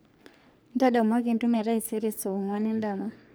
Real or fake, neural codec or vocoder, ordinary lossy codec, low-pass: fake; codec, 44.1 kHz, 3.4 kbps, Pupu-Codec; none; none